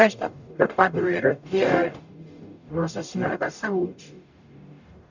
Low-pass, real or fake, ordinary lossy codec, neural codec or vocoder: 7.2 kHz; fake; none; codec, 44.1 kHz, 0.9 kbps, DAC